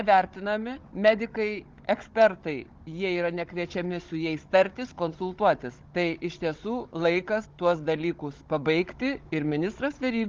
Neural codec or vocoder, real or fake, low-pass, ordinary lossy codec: codec, 16 kHz, 16 kbps, FunCodec, trained on Chinese and English, 50 frames a second; fake; 7.2 kHz; Opus, 32 kbps